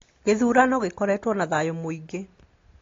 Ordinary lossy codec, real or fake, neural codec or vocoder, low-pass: AAC, 32 kbps; real; none; 7.2 kHz